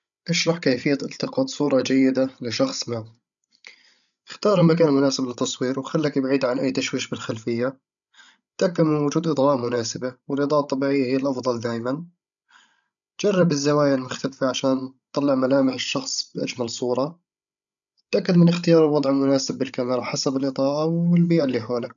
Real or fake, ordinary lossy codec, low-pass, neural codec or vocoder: fake; none; 7.2 kHz; codec, 16 kHz, 16 kbps, FreqCodec, larger model